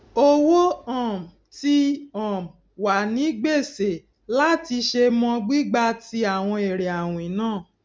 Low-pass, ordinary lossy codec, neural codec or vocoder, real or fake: none; none; none; real